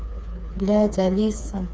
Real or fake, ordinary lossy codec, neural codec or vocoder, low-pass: fake; none; codec, 16 kHz, 4 kbps, FreqCodec, smaller model; none